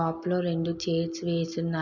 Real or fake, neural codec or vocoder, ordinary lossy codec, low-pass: real; none; none; 7.2 kHz